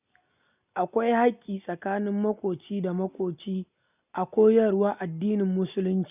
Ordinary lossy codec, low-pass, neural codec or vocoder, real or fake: none; 3.6 kHz; none; real